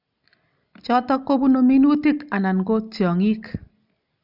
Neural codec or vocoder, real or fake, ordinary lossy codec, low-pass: none; real; none; 5.4 kHz